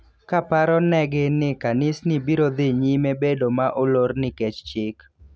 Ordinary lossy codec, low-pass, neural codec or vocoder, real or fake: none; none; none; real